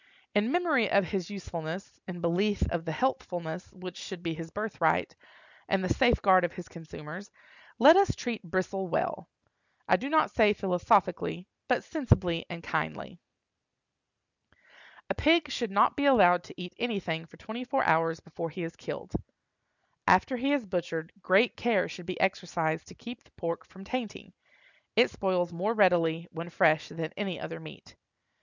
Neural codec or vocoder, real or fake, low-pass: none; real; 7.2 kHz